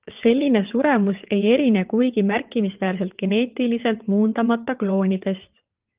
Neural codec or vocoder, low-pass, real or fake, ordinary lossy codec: vocoder, 22.05 kHz, 80 mel bands, WaveNeXt; 3.6 kHz; fake; Opus, 32 kbps